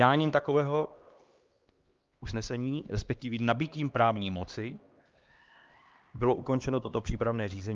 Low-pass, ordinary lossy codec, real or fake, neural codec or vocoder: 7.2 kHz; Opus, 32 kbps; fake; codec, 16 kHz, 2 kbps, X-Codec, HuBERT features, trained on LibriSpeech